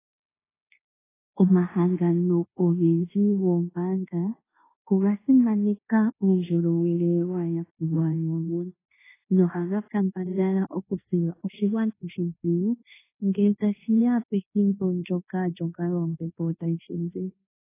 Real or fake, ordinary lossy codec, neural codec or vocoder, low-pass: fake; AAC, 16 kbps; codec, 16 kHz in and 24 kHz out, 0.9 kbps, LongCat-Audio-Codec, fine tuned four codebook decoder; 3.6 kHz